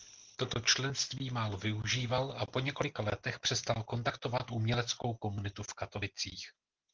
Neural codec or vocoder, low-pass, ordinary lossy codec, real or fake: none; 7.2 kHz; Opus, 16 kbps; real